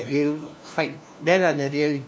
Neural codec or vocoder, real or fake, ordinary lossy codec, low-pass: codec, 16 kHz, 1 kbps, FunCodec, trained on LibriTTS, 50 frames a second; fake; none; none